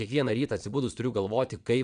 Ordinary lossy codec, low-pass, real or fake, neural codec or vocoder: MP3, 96 kbps; 9.9 kHz; fake; vocoder, 22.05 kHz, 80 mel bands, Vocos